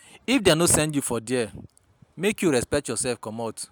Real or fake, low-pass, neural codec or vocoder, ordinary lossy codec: real; none; none; none